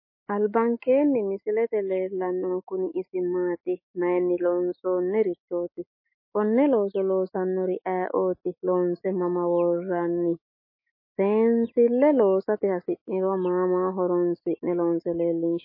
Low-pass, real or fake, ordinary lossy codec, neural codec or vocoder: 5.4 kHz; fake; MP3, 24 kbps; autoencoder, 48 kHz, 128 numbers a frame, DAC-VAE, trained on Japanese speech